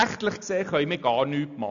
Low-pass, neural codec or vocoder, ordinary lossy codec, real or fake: 7.2 kHz; none; none; real